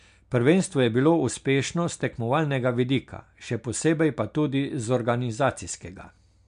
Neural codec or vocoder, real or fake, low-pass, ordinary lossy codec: none; real; 9.9 kHz; MP3, 64 kbps